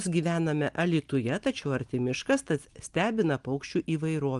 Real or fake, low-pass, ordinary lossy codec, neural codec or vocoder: real; 10.8 kHz; Opus, 32 kbps; none